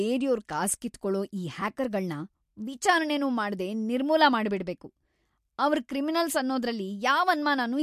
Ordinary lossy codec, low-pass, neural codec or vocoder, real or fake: MP3, 64 kbps; 14.4 kHz; none; real